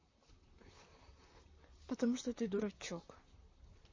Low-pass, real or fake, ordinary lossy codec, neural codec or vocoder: 7.2 kHz; fake; MP3, 32 kbps; vocoder, 44.1 kHz, 128 mel bands, Pupu-Vocoder